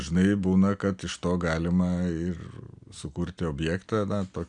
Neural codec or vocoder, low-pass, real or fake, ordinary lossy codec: none; 9.9 kHz; real; MP3, 96 kbps